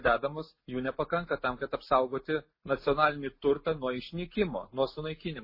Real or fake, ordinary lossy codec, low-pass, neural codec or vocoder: real; MP3, 24 kbps; 5.4 kHz; none